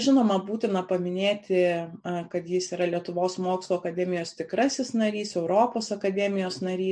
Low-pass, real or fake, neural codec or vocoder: 9.9 kHz; real; none